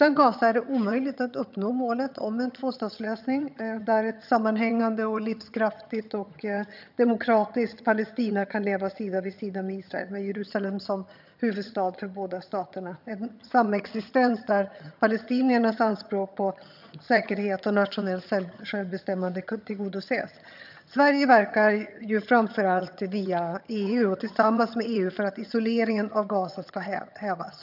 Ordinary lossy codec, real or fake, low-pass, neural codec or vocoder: none; fake; 5.4 kHz; vocoder, 22.05 kHz, 80 mel bands, HiFi-GAN